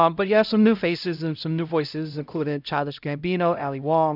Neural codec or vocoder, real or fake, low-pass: codec, 16 kHz, 0.5 kbps, X-Codec, HuBERT features, trained on LibriSpeech; fake; 5.4 kHz